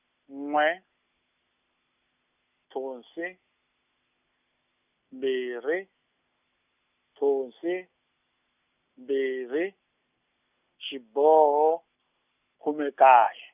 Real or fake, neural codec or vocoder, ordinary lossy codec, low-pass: real; none; none; 3.6 kHz